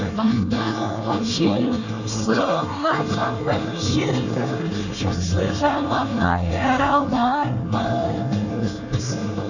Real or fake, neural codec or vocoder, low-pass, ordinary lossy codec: fake; codec, 24 kHz, 1 kbps, SNAC; 7.2 kHz; none